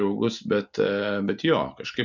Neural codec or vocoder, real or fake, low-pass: none; real; 7.2 kHz